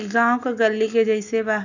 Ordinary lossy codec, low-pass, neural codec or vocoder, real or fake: none; 7.2 kHz; none; real